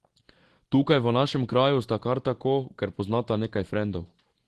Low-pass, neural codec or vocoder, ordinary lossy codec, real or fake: 10.8 kHz; none; Opus, 16 kbps; real